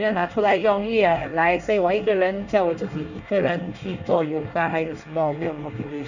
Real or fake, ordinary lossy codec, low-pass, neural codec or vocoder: fake; none; 7.2 kHz; codec, 24 kHz, 1 kbps, SNAC